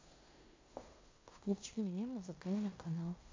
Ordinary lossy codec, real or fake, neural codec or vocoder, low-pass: MP3, 48 kbps; fake; codec, 16 kHz in and 24 kHz out, 0.9 kbps, LongCat-Audio-Codec, fine tuned four codebook decoder; 7.2 kHz